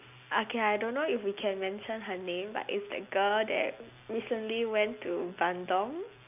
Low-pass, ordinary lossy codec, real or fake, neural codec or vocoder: 3.6 kHz; none; real; none